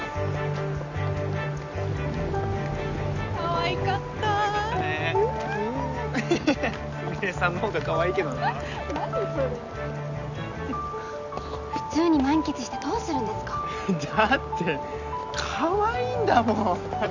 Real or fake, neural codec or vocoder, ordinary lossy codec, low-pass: real; none; none; 7.2 kHz